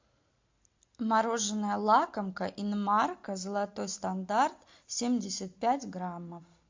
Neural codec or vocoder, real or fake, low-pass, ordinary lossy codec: none; real; 7.2 kHz; MP3, 48 kbps